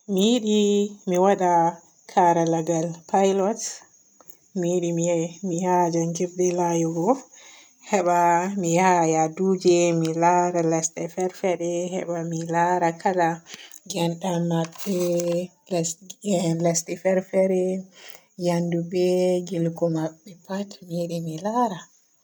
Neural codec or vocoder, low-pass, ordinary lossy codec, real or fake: none; none; none; real